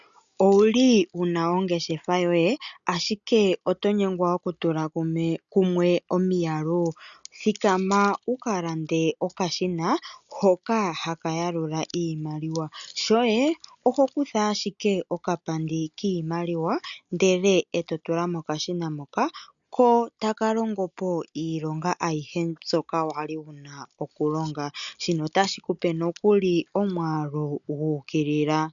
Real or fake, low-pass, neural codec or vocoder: real; 7.2 kHz; none